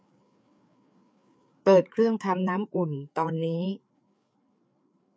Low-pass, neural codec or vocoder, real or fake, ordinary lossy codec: none; codec, 16 kHz, 4 kbps, FreqCodec, larger model; fake; none